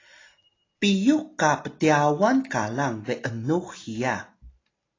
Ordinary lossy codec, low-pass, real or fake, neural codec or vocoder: AAC, 32 kbps; 7.2 kHz; real; none